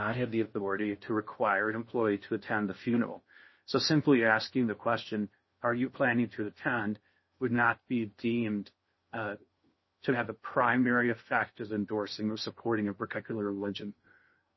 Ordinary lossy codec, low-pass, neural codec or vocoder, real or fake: MP3, 24 kbps; 7.2 kHz; codec, 16 kHz in and 24 kHz out, 0.6 kbps, FocalCodec, streaming, 4096 codes; fake